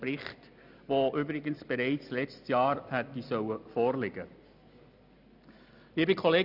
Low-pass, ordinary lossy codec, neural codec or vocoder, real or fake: 5.4 kHz; none; none; real